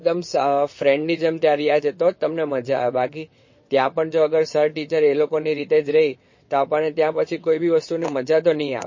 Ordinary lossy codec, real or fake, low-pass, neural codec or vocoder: MP3, 32 kbps; fake; 7.2 kHz; vocoder, 22.05 kHz, 80 mel bands, WaveNeXt